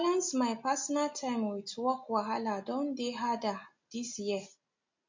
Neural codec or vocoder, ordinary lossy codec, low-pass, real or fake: none; MP3, 48 kbps; 7.2 kHz; real